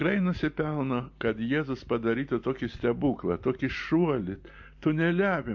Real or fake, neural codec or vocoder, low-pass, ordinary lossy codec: real; none; 7.2 kHz; MP3, 48 kbps